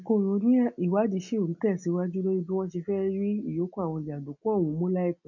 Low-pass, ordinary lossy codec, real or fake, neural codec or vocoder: 7.2 kHz; none; real; none